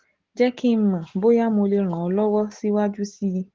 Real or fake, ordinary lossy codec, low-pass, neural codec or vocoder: real; Opus, 16 kbps; 7.2 kHz; none